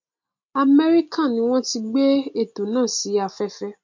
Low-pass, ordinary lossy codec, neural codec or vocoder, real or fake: 7.2 kHz; MP3, 48 kbps; none; real